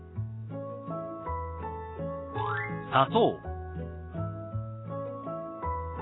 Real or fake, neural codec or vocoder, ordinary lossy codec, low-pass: real; none; AAC, 16 kbps; 7.2 kHz